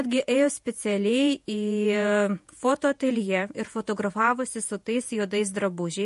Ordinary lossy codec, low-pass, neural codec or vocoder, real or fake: MP3, 48 kbps; 14.4 kHz; vocoder, 48 kHz, 128 mel bands, Vocos; fake